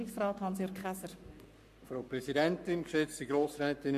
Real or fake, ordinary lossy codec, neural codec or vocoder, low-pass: fake; MP3, 64 kbps; codec, 44.1 kHz, 7.8 kbps, Pupu-Codec; 14.4 kHz